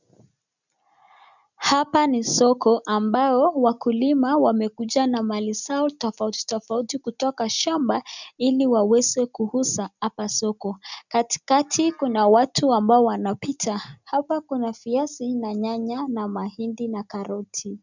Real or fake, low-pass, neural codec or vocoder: real; 7.2 kHz; none